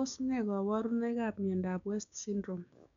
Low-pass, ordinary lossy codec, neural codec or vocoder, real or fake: 7.2 kHz; none; codec, 16 kHz, 6 kbps, DAC; fake